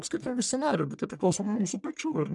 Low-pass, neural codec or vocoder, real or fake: 10.8 kHz; codec, 44.1 kHz, 1.7 kbps, Pupu-Codec; fake